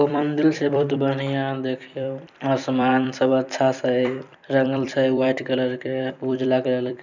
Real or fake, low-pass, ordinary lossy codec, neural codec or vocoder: fake; 7.2 kHz; none; vocoder, 44.1 kHz, 128 mel bands every 256 samples, BigVGAN v2